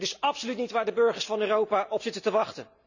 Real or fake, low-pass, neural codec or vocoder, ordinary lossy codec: real; 7.2 kHz; none; none